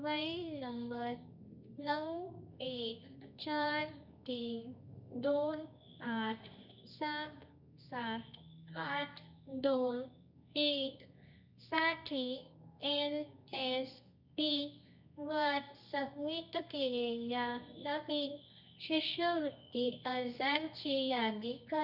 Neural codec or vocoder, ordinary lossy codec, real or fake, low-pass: codec, 24 kHz, 0.9 kbps, WavTokenizer, medium music audio release; none; fake; 5.4 kHz